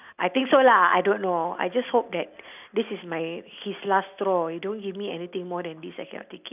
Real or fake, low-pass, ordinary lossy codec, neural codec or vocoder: real; 3.6 kHz; none; none